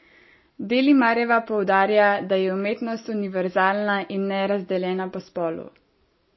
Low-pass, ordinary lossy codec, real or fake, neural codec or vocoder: 7.2 kHz; MP3, 24 kbps; real; none